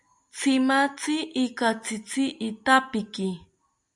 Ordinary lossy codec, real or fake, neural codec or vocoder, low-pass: MP3, 96 kbps; real; none; 10.8 kHz